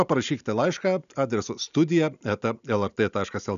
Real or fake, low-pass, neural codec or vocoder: real; 7.2 kHz; none